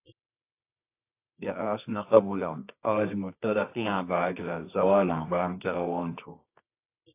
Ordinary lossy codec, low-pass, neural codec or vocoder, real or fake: AAC, 24 kbps; 3.6 kHz; codec, 24 kHz, 0.9 kbps, WavTokenizer, medium music audio release; fake